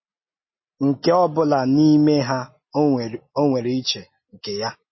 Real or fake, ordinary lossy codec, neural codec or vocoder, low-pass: real; MP3, 24 kbps; none; 7.2 kHz